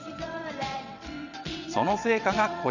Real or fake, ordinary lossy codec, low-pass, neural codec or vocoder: fake; none; 7.2 kHz; vocoder, 22.05 kHz, 80 mel bands, WaveNeXt